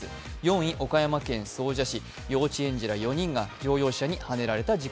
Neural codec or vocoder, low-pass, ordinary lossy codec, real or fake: none; none; none; real